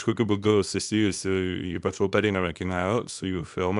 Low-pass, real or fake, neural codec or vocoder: 10.8 kHz; fake; codec, 24 kHz, 0.9 kbps, WavTokenizer, small release